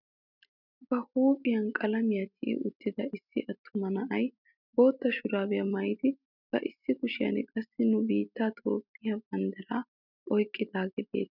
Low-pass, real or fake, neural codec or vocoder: 5.4 kHz; real; none